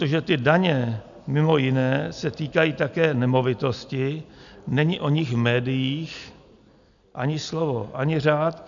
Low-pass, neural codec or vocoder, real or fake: 7.2 kHz; none; real